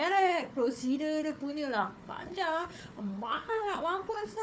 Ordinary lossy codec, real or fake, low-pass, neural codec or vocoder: none; fake; none; codec, 16 kHz, 4 kbps, FunCodec, trained on Chinese and English, 50 frames a second